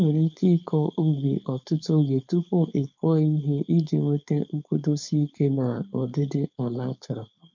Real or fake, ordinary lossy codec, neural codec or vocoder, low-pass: fake; MP3, 64 kbps; codec, 16 kHz, 4.8 kbps, FACodec; 7.2 kHz